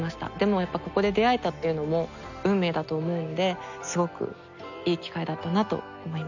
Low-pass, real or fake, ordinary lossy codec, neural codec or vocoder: 7.2 kHz; real; none; none